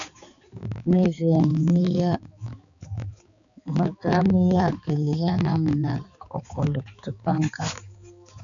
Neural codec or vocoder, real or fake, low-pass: codec, 16 kHz, 4 kbps, X-Codec, HuBERT features, trained on balanced general audio; fake; 7.2 kHz